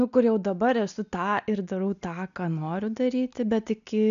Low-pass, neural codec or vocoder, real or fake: 7.2 kHz; none; real